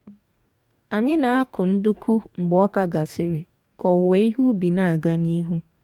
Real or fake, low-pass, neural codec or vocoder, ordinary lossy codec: fake; 19.8 kHz; codec, 44.1 kHz, 2.6 kbps, DAC; MP3, 96 kbps